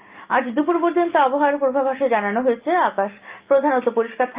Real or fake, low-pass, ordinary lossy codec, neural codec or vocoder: real; 3.6 kHz; Opus, 32 kbps; none